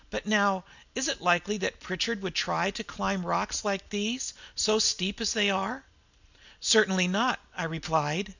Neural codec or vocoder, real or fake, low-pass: none; real; 7.2 kHz